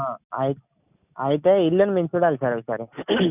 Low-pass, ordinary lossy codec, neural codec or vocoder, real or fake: 3.6 kHz; none; none; real